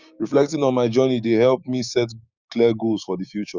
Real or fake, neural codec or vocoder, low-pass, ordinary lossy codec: fake; codec, 16 kHz, 6 kbps, DAC; 7.2 kHz; Opus, 64 kbps